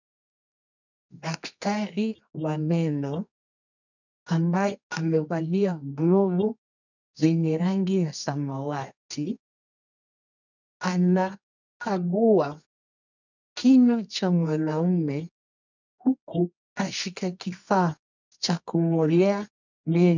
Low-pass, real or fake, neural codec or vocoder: 7.2 kHz; fake; codec, 24 kHz, 0.9 kbps, WavTokenizer, medium music audio release